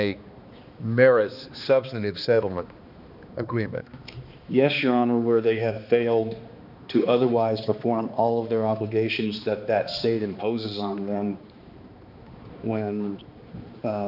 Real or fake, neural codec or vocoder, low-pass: fake; codec, 16 kHz, 2 kbps, X-Codec, HuBERT features, trained on balanced general audio; 5.4 kHz